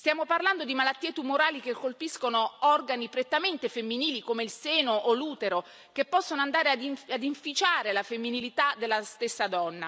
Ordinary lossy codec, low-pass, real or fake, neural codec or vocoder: none; none; real; none